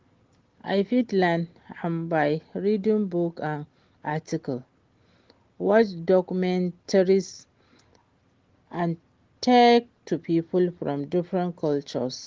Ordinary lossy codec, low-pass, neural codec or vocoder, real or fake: Opus, 16 kbps; 7.2 kHz; none; real